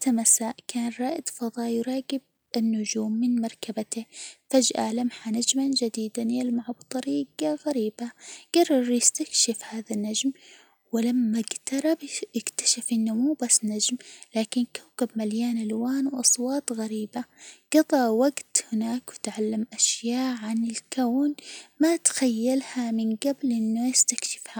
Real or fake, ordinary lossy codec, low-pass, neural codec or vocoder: real; none; none; none